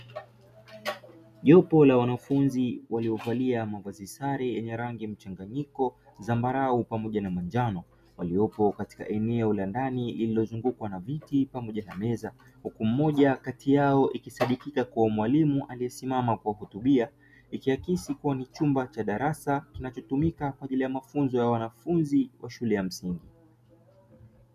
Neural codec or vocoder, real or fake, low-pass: none; real; 14.4 kHz